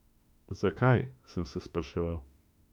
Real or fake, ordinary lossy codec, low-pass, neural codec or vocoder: fake; none; 19.8 kHz; autoencoder, 48 kHz, 32 numbers a frame, DAC-VAE, trained on Japanese speech